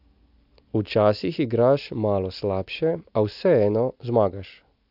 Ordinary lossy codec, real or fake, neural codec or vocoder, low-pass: AAC, 48 kbps; real; none; 5.4 kHz